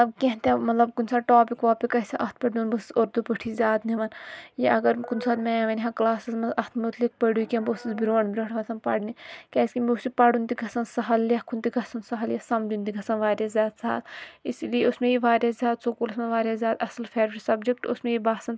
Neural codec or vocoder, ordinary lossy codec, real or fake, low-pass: none; none; real; none